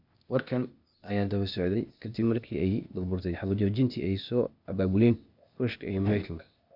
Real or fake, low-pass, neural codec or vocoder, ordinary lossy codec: fake; 5.4 kHz; codec, 16 kHz, 0.8 kbps, ZipCodec; none